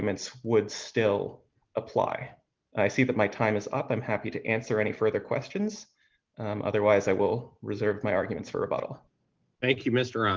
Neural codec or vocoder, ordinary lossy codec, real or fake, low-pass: none; Opus, 32 kbps; real; 7.2 kHz